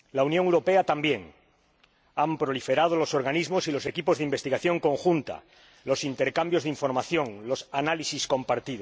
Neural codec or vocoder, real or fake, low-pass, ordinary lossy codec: none; real; none; none